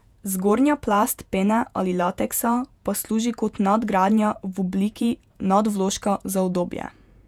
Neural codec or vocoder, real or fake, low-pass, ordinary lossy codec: vocoder, 48 kHz, 128 mel bands, Vocos; fake; 19.8 kHz; none